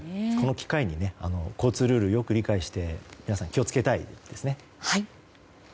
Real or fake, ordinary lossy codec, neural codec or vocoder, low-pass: real; none; none; none